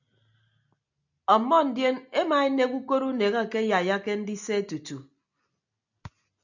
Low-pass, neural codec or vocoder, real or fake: 7.2 kHz; none; real